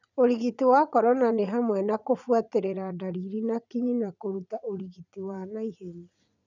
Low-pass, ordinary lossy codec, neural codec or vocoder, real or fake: 7.2 kHz; none; vocoder, 44.1 kHz, 80 mel bands, Vocos; fake